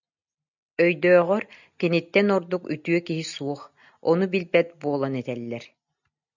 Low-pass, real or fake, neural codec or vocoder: 7.2 kHz; real; none